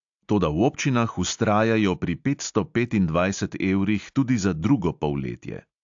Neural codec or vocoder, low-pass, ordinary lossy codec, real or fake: none; 7.2 kHz; AAC, 64 kbps; real